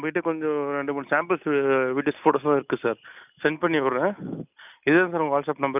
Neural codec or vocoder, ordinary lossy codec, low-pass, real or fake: none; none; 3.6 kHz; real